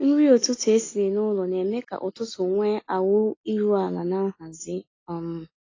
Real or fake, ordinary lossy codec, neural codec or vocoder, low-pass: real; AAC, 32 kbps; none; 7.2 kHz